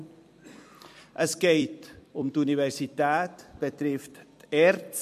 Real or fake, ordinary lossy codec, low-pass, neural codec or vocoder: fake; MP3, 64 kbps; 14.4 kHz; vocoder, 44.1 kHz, 128 mel bands every 256 samples, BigVGAN v2